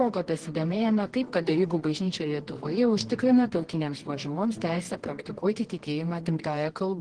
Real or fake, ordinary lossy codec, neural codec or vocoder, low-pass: fake; Opus, 16 kbps; codec, 24 kHz, 0.9 kbps, WavTokenizer, medium music audio release; 9.9 kHz